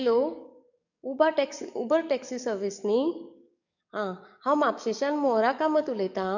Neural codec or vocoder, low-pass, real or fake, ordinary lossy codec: codec, 44.1 kHz, 7.8 kbps, DAC; 7.2 kHz; fake; none